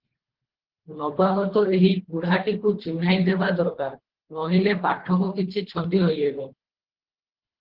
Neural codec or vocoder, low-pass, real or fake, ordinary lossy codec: codec, 24 kHz, 3 kbps, HILCodec; 5.4 kHz; fake; Opus, 16 kbps